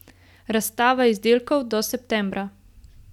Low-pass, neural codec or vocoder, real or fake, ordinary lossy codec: 19.8 kHz; none; real; none